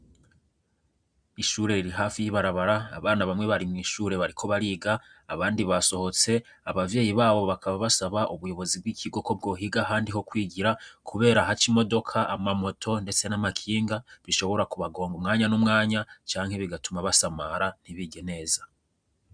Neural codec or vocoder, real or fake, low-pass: none; real; 9.9 kHz